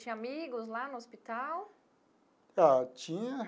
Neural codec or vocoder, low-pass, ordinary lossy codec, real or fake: none; none; none; real